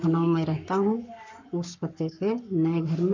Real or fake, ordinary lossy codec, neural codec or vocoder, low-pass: fake; none; codec, 44.1 kHz, 7.8 kbps, Pupu-Codec; 7.2 kHz